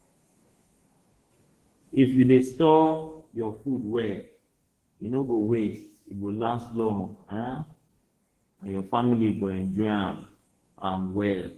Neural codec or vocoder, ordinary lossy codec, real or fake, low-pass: codec, 44.1 kHz, 2.6 kbps, DAC; Opus, 16 kbps; fake; 14.4 kHz